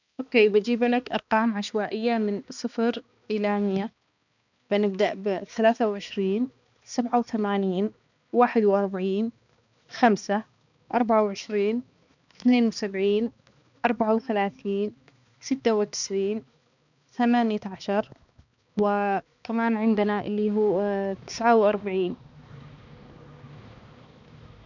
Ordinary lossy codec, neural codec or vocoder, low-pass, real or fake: none; codec, 16 kHz, 2 kbps, X-Codec, HuBERT features, trained on balanced general audio; 7.2 kHz; fake